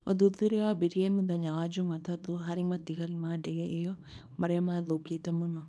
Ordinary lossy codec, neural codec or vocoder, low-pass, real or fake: none; codec, 24 kHz, 0.9 kbps, WavTokenizer, small release; none; fake